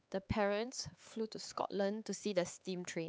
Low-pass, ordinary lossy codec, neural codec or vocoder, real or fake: none; none; codec, 16 kHz, 4 kbps, X-Codec, HuBERT features, trained on LibriSpeech; fake